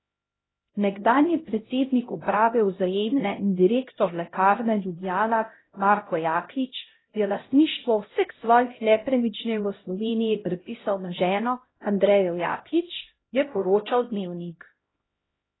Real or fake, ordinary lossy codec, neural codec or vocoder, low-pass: fake; AAC, 16 kbps; codec, 16 kHz, 0.5 kbps, X-Codec, HuBERT features, trained on LibriSpeech; 7.2 kHz